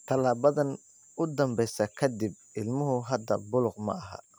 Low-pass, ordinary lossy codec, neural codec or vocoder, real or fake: none; none; none; real